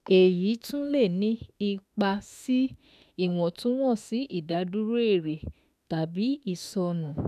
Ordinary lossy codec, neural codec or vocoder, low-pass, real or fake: none; autoencoder, 48 kHz, 32 numbers a frame, DAC-VAE, trained on Japanese speech; 14.4 kHz; fake